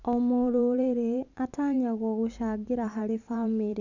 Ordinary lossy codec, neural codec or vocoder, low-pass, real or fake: none; vocoder, 44.1 kHz, 128 mel bands every 512 samples, BigVGAN v2; 7.2 kHz; fake